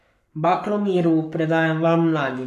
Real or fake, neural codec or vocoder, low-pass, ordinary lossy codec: fake; codec, 44.1 kHz, 7.8 kbps, Pupu-Codec; 14.4 kHz; none